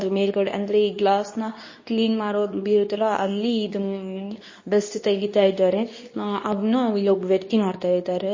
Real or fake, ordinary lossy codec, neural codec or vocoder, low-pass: fake; MP3, 32 kbps; codec, 24 kHz, 0.9 kbps, WavTokenizer, medium speech release version 2; 7.2 kHz